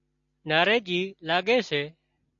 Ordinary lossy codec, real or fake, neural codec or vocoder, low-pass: AAC, 64 kbps; real; none; 7.2 kHz